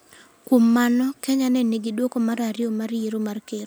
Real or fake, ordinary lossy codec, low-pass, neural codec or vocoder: real; none; none; none